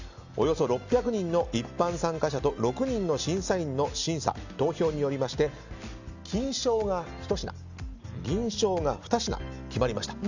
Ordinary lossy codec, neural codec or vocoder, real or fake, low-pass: Opus, 64 kbps; none; real; 7.2 kHz